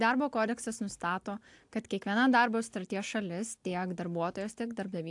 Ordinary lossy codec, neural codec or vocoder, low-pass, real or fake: AAC, 64 kbps; none; 10.8 kHz; real